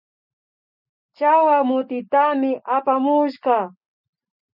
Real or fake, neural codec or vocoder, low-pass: real; none; 5.4 kHz